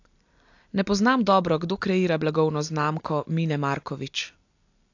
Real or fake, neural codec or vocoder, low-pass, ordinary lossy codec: real; none; 7.2 kHz; AAC, 48 kbps